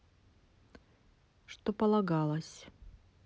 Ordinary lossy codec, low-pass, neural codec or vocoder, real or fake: none; none; none; real